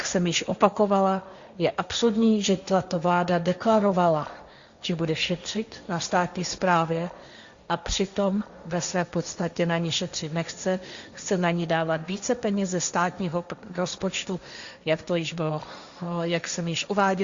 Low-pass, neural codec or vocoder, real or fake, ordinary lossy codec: 7.2 kHz; codec, 16 kHz, 1.1 kbps, Voila-Tokenizer; fake; Opus, 64 kbps